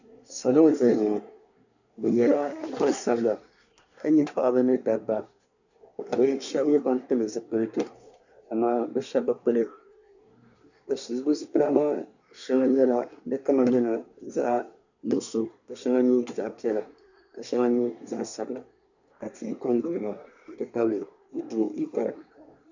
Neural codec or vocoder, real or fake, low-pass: codec, 24 kHz, 1 kbps, SNAC; fake; 7.2 kHz